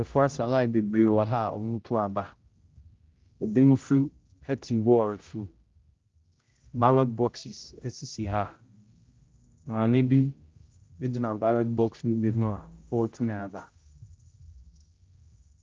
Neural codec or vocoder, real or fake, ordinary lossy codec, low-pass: codec, 16 kHz, 0.5 kbps, X-Codec, HuBERT features, trained on general audio; fake; Opus, 32 kbps; 7.2 kHz